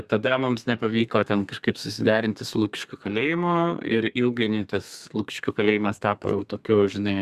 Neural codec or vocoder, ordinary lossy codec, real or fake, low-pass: codec, 32 kHz, 1.9 kbps, SNAC; Opus, 64 kbps; fake; 14.4 kHz